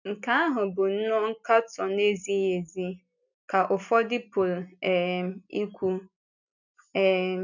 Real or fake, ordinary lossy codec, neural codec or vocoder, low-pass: real; none; none; 7.2 kHz